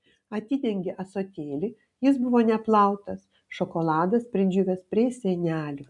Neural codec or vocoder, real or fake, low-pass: none; real; 10.8 kHz